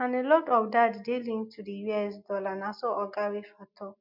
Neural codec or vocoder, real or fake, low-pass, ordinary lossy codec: none; real; 5.4 kHz; MP3, 48 kbps